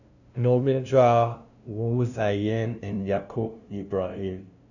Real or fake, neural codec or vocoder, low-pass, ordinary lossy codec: fake; codec, 16 kHz, 0.5 kbps, FunCodec, trained on LibriTTS, 25 frames a second; 7.2 kHz; none